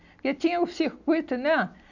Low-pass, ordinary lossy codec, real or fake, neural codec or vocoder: 7.2 kHz; none; real; none